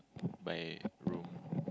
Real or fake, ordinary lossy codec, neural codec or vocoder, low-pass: real; none; none; none